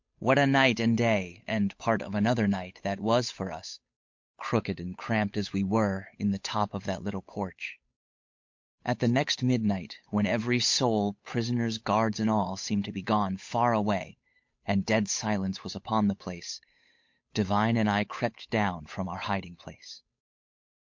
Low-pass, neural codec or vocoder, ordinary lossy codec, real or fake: 7.2 kHz; codec, 16 kHz, 8 kbps, FunCodec, trained on Chinese and English, 25 frames a second; MP3, 48 kbps; fake